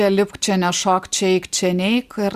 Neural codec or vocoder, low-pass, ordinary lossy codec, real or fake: none; 14.4 kHz; Opus, 64 kbps; real